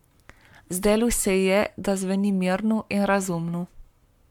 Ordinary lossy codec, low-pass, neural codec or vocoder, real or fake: MP3, 96 kbps; 19.8 kHz; codec, 44.1 kHz, 7.8 kbps, Pupu-Codec; fake